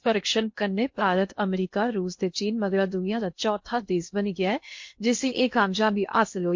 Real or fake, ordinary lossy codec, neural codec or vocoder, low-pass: fake; MP3, 48 kbps; codec, 16 kHz in and 24 kHz out, 0.8 kbps, FocalCodec, streaming, 65536 codes; 7.2 kHz